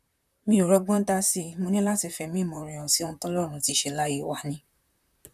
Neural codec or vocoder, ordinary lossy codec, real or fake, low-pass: vocoder, 44.1 kHz, 128 mel bands, Pupu-Vocoder; none; fake; 14.4 kHz